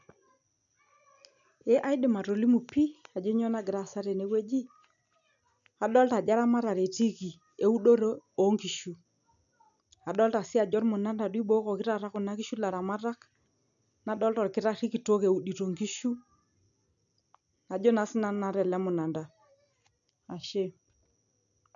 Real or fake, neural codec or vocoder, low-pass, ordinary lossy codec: real; none; 7.2 kHz; MP3, 96 kbps